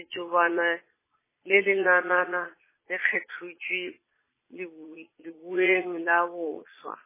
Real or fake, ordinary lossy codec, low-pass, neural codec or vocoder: fake; MP3, 16 kbps; 3.6 kHz; vocoder, 22.05 kHz, 80 mel bands, Vocos